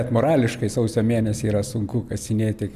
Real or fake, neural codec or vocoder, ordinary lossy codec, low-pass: real; none; MP3, 96 kbps; 14.4 kHz